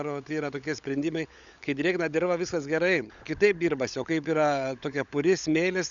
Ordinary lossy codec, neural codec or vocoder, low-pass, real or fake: Opus, 64 kbps; codec, 16 kHz, 16 kbps, FunCodec, trained on LibriTTS, 50 frames a second; 7.2 kHz; fake